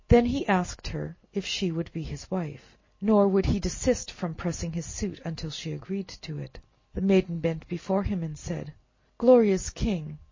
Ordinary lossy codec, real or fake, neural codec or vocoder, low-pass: MP3, 32 kbps; real; none; 7.2 kHz